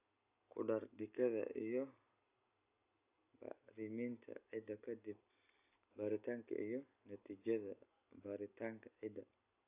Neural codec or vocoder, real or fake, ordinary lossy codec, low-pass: none; real; AAC, 32 kbps; 3.6 kHz